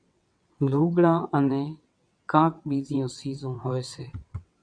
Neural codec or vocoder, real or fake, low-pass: vocoder, 22.05 kHz, 80 mel bands, WaveNeXt; fake; 9.9 kHz